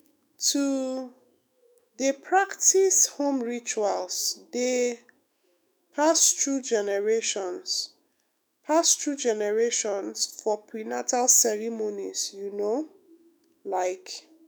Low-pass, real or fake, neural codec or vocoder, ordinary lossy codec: none; fake; autoencoder, 48 kHz, 128 numbers a frame, DAC-VAE, trained on Japanese speech; none